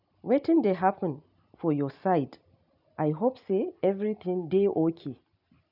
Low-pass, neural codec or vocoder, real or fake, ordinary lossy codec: 5.4 kHz; none; real; none